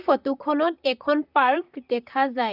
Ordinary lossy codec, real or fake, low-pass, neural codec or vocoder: none; fake; 5.4 kHz; vocoder, 44.1 kHz, 128 mel bands, Pupu-Vocoder